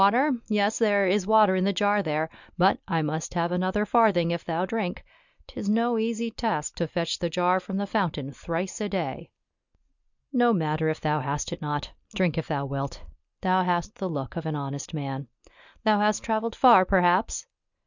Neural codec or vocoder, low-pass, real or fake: none; 7.2 kHz; real